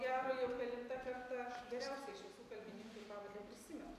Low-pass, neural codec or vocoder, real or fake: 14.4 kHz; none; real